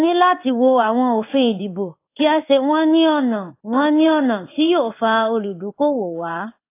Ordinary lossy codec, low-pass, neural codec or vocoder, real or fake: AAC, 24 kbps; 3.6 kHz; codec, 16 kHz in and 24 kHz out, 1 kbps, XY-Tokenizer; fake